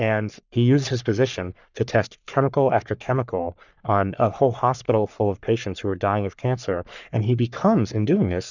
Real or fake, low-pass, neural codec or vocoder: fake; 7.2 kHz; codec, 44.1 kHz, 3.4 kbps, Pupu-Codec